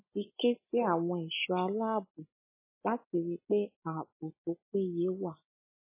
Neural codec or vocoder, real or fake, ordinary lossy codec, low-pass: none; real; MP3, 16 kbps; 3.6 kHz